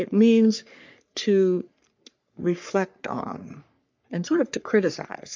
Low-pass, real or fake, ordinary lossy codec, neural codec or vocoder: 7.2 kHz; fake; MP3, 64 kbps; codec, 44.1 kHz, 3.4 kbps, Pupu-Codec